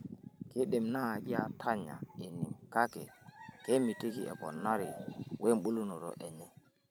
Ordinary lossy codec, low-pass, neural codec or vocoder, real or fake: none; none; vocoder, 44.1 kHz, 128 mel bands every 512 samples, BigVGAN v2; fake